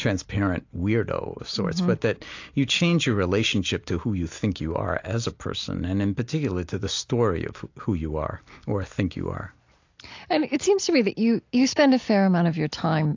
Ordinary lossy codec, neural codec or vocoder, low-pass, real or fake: AAC, 48 kbps; none; 7.2 kHz; real